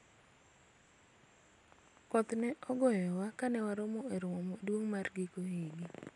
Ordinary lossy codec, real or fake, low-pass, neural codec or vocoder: none; real; 10.8 kHz; none